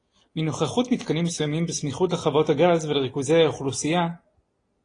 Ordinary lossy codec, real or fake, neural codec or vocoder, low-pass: AAC, 32 kbps; real; none; 9.9 kHz